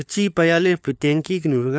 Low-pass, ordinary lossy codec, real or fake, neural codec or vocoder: none; none; fake; codec, 16 kHz, 4 kbps, FunCodec, trained on LibriTTS, 50 frames a second